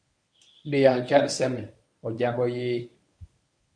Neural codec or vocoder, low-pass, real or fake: codec, 24 kHz, 0.9 kbps, WavTokenizer, medium speech release version 1; 9.9 kHz; fake